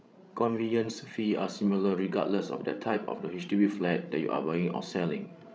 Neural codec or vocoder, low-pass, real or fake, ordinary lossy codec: codec, 16 kHz, 16 kbps, FreqCodec, larger model; none; fake; none